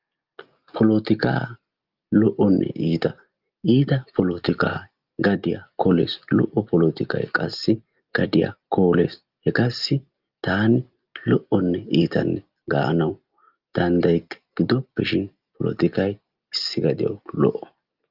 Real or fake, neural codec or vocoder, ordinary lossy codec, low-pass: real; none; Opus, 32 kbps; 5.4 kHz